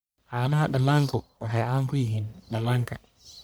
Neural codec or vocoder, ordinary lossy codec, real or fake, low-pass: codec, 44.1 kHz, 1.7 kbps, Pupu-Codec; none; fake; none